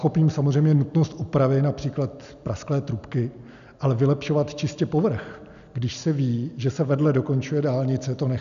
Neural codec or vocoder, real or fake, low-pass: none; real; 7.2 kHz